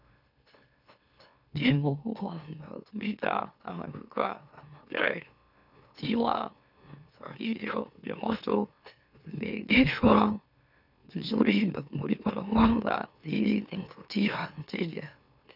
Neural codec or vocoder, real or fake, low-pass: autoencoder, 44.1 kHz, a latent of 192 numbers a frame, MeloTTS; fake; 5.4 kHz